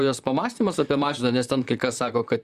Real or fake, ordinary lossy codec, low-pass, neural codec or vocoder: fake; Opus, 64 kbps; 14.4 kHz; vocoder, 44.1 kHz, 128 mel bands, Pupu-Vocoder